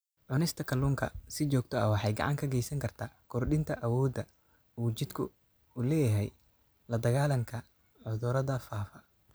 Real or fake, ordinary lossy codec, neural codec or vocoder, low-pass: real; none; none; none